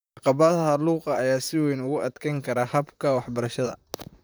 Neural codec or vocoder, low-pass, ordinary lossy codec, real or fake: vocoder, 44.1 kHz, 128 mel bands, Pupu-Vocoder; none; none; fake